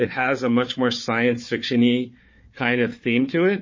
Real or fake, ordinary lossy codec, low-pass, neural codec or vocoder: fake; MP3, 32 kbps; 7.2 kHz; codec, 16 kHz, 4 kbps, FunCodec, trained on Chinese and English, 50 frames a second